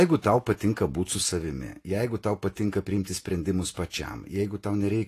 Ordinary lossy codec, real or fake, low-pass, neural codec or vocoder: AAC, 48 kbps; fake; 14.4 kHz; vocoder, 48 kHz, 128 mel bands, Vocos